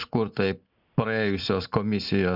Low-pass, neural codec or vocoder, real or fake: 5.4 kHz; none; real